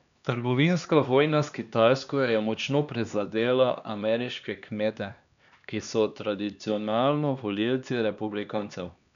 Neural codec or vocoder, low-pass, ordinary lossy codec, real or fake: codec, 16 kHz, 2 kbps, X-Codec, HuBERT features, trained on LibriSpeech; 7.2 kHz; none; fake